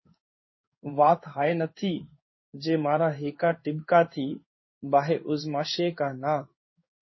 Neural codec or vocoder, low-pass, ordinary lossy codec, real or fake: codec, 16 kHz in and 24 kHz out, 1 kbps, XY-Tokenizer; 7.2 kHz; MP3, 24 kbps; fake